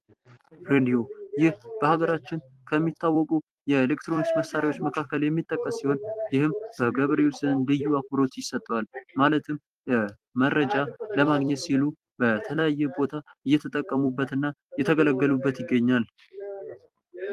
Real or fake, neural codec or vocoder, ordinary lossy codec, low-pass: fake; vocoder, 44.1 kHz, 128 mel bands every 256 samples, BigVGAN v2; Opus, 24 kbps; 14.4 kHz